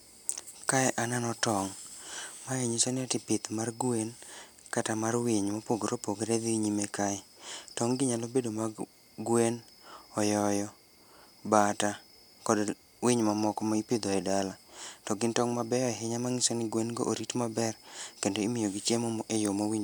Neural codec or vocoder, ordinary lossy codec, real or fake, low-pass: none; none; real; none